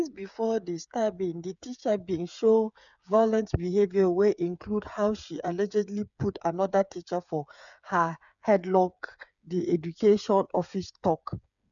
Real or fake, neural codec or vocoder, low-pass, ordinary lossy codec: fake; codec, 16 kHz, 8 kbps, FreqCodec, smaller model; 7.2 kHz; none